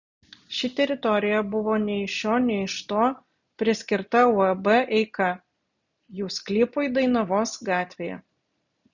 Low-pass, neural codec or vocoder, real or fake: 7.2 kHz; none; real